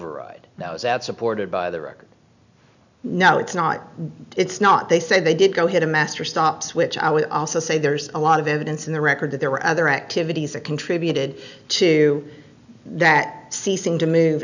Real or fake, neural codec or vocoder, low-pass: real; none; 7.2 kHz